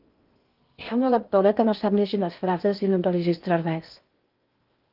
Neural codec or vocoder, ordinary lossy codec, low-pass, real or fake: codec, 16 kHz in and 24 kHz out, 0.6 kbps, FocalCodec, streaming, 2048 codes; Opus, 32 kbps; 5.4 kHz; fake